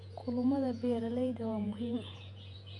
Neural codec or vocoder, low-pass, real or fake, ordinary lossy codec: vocoder, 48 kHz, 128 mel bands, Vocos; 10.8 kHz; fake; MP3, 96 kbps